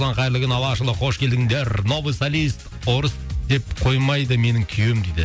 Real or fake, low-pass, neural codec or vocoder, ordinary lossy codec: real; none; none; none